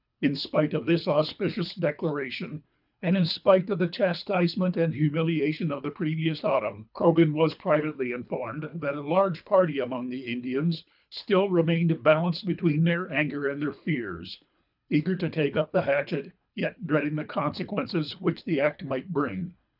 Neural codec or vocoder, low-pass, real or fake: codec, 24 kHz, 3 kbps, HILCodec; 5.4 kHz; fake